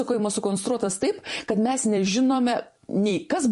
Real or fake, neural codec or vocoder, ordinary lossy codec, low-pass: fake; vocoder, 44.1 kHz, 128 mel bands every 256 samples, BigVGAN v2; MP3, 48 kbps; 14.4 kHz